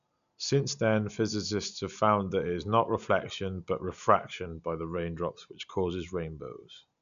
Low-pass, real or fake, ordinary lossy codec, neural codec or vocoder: 7.2 kHz; real; none; none